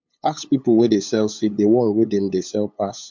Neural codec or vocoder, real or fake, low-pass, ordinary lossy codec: codec, 16 kHz, 8 kbps, FunCodec, trained on LibriTTS, 25 frames a second; fake; 7.2 kHz; MP3, 64 kbps